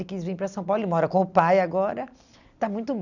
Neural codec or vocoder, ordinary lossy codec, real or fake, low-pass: none; none; real; 7.2 kHz